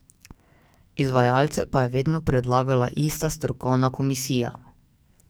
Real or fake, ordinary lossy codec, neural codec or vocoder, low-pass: fake; none; codec, 44.1 kHz, 2.6 kbps, SNAC; none